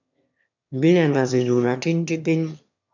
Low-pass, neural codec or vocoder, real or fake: 7.2 kHz; autoencoder, 22.05 kHz, a latent of 192 numbers a frame, VITS, trained on one speaker; fake